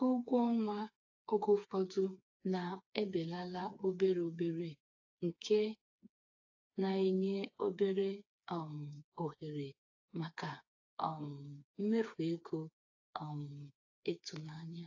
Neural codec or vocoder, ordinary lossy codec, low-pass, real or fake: codec, 16 kHz, 4 kbps, FreqCodec, smaller model; AAC, 48 kbps; 7.2 kHz; fake